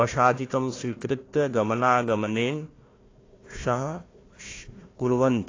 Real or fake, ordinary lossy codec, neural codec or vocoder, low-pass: fake; AAC, 32 kbps; codec, 16 kHz, 1 kbps, FunCodec, trained on Chinese and English, 50 frames a second; 7.2 kHz